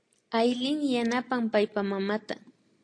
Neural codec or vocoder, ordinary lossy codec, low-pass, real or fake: none; AAC, 48 kbps; 9.9 kHz; real